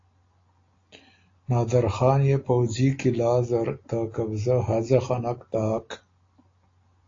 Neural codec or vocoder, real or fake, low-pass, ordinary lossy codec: none; real; 7.2 kHz; AAC, 32 kbps